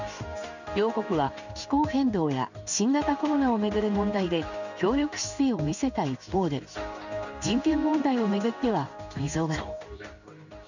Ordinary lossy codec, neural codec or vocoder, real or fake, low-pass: none; codec, 16 kHz in and 24 kHz out, 1 kbps, XY-Tokenizer; fake; 7.2 kHz